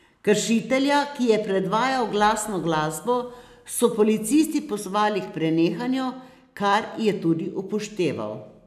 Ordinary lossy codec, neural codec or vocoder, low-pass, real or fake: none; none; 14.4 kHz; real